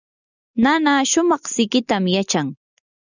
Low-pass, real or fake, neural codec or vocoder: 7.2 kHz; real; none